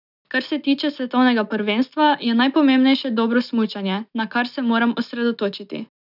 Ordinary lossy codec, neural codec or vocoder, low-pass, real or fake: none; none; 5.4 kHz; real